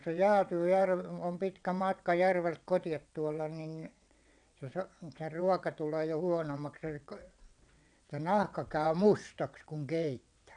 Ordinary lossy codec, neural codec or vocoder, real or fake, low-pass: none; vocoder, 22.05 kHz, 80 mel bands, WaveNeXt; fake; 9.9 kHz